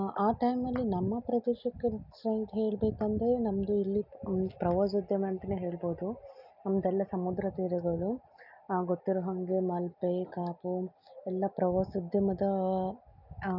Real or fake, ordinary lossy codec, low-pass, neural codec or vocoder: real; none; 5.4 kHz; none